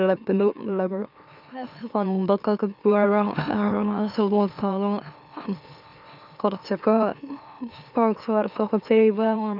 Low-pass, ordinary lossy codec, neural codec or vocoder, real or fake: 5.4 kHz; none; autoencoder, 44.1 kHz, a latent of 192 numbers a frame, MeloTTS; fake